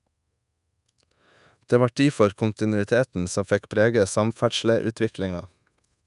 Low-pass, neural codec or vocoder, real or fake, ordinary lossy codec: 10.8 kHz; codec, 24 kHz, 1.2 kbps, DualCodec; fake; none